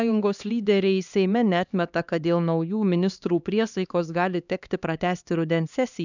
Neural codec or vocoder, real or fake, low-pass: codec, 16 kHz, 2 kbps, X-Codec, HuBERT features, trained on LibriSpeech; fake; 7.2 kHz